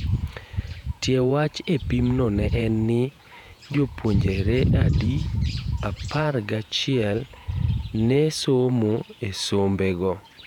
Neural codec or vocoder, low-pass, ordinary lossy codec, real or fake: vocoder, 48 kHz, 128 mel bands, Vocos; 19.8 kHz; none; fake